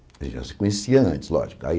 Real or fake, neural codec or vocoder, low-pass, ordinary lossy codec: real; none; none; none